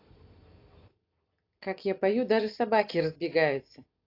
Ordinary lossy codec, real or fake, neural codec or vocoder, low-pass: AAC, 32 kbps; real; none; 5.4 kHz